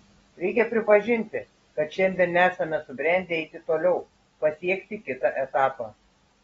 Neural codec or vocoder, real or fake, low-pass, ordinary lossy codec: none; real; 14.4 kHz; AAC, 24 kbps